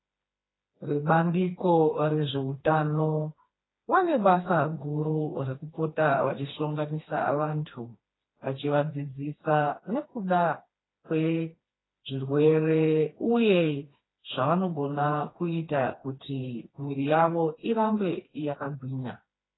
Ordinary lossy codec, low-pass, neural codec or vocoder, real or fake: AAC, 16 kbps; 7.2 kHz; codec, 16 kHz, 2 kbps, FreqCodec, smaller model; fake